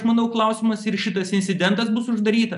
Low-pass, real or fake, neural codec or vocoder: 10.8 kHz; real; none